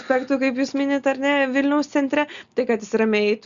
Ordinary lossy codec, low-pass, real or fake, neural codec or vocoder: Opus, 64 kbps; 7.2 kHz; real; none